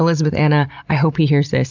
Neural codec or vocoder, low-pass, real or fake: none; 7.2 kHz; real